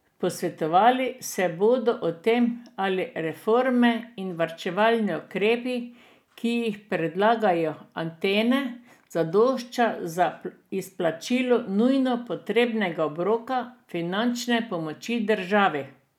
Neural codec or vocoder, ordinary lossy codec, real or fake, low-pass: none; none; real; 19.8 kHz